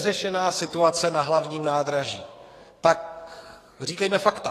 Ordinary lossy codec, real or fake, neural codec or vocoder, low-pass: AAC, 48 kbps; fake; codec, 44.1 kHz, 2.6 kbps, SNAC; 14.4 kHz